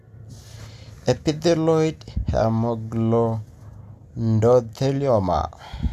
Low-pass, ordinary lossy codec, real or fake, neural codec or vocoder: 14.4 kHz; none; real; none